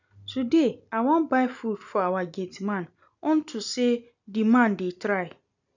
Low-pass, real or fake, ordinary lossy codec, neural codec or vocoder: 7.2 kHz; real; none; none